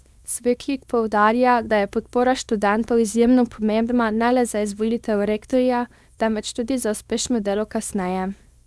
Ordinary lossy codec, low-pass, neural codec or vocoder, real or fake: none; none; codec, 24 kHz, 0.9 kbps, WavTokenizer, small release; fake